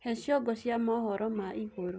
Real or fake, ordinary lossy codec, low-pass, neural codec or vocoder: real; none; none; none